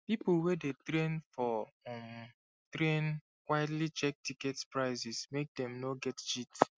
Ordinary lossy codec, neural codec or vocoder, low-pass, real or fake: none; none; none; real